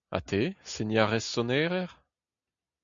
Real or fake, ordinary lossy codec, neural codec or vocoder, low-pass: real; MP3, 64 kbps; none; 7.2 kHz